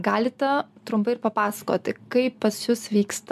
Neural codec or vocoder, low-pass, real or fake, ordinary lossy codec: none; 14.4 kHz; real; MP3, 96 kbps